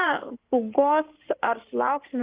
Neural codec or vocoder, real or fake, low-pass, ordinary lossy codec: codec, 16 kHz in and 24 kHz out, 2.2 kbps, FireRedTTS-2 codec; fake; 3.6 kHz; Opus, 32 kbps